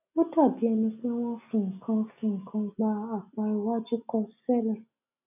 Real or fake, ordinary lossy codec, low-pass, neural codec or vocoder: real; none; 3.6 kHz; none